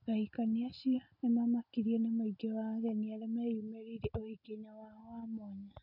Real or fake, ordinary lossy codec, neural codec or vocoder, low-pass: real; none; none; 5.4 kHz